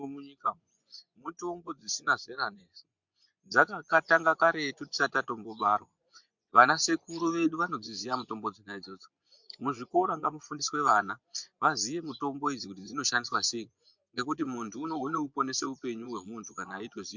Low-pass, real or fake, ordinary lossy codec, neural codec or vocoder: 7.2 kHz; fake; MP3, 64 kbps; vocoder, 24 kHz, 100 mel bands, Vocos